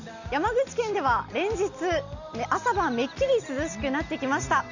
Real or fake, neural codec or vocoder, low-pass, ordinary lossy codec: real; none; 7.2 kHz; none